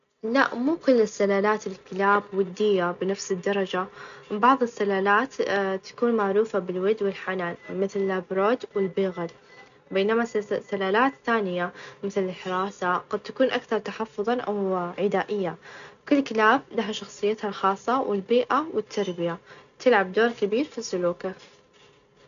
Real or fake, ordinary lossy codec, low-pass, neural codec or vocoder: real; none; 7.2 kHz; none